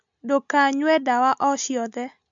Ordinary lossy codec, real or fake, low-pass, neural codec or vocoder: MP3, 64 kbps; real; 7.2 kHz; none